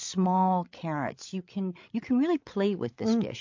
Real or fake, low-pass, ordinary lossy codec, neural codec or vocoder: fake; 7.2 kHz; MP3, 48 kbps; codec, 16 kHz, 8 kbps, FreqCodec, larger model